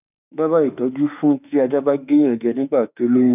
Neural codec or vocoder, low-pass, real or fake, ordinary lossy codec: autoencoder, 48 kHz, 32 numbers a frame, DAC-VAE, trained on Japanese speech; 3.6 kHz; fake; none